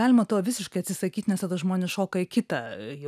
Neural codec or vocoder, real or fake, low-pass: none; real; 14.4 kHz